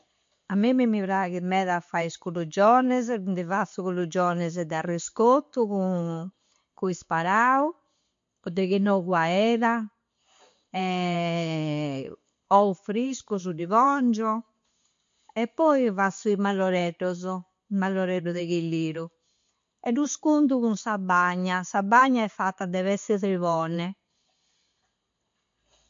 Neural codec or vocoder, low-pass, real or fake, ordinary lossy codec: none; 7.2 kHz; real; MP3, 48 kbps